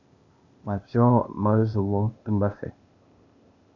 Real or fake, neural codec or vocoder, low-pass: fake; codec, 16 kHz, 0.8 kbps, ZipCodec; 7.2 kHz